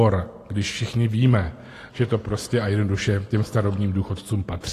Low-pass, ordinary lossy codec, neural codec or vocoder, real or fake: 14.4 kHz; AAC, 48 kbps; none; real